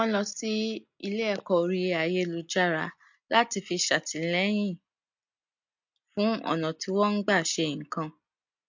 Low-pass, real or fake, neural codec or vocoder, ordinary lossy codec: 7.2 kHz; real; none; MP3, 48 kbps